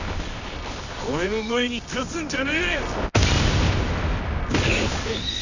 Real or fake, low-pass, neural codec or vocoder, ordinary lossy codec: fake; 7.2 kHz; codec, 24 kHz, 0.9 kbps, WavTokenizer, medium music audio release; none